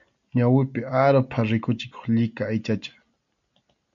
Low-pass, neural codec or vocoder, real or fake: 7.2 kHz; none; real